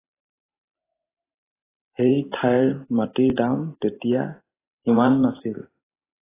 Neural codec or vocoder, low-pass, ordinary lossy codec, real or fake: vocoder, 44.1 kHz, 128 mel bands every 512 samples, BigVGAN v2; 3.6 kHz; AAC, 16 kbps; fake